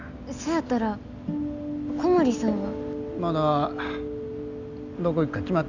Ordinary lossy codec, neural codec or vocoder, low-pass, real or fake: none; none; 7.2 kHz; real